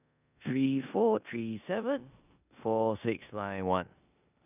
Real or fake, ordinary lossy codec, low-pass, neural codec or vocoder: fake; none; 3.6 kHz; codec, 16 kHz in and 24 kHz out, 0.9 kbps, LongCat-Audio-Codec, four codebook decoder